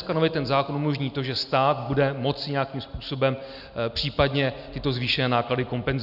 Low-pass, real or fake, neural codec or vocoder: 5.4 kHz; real; none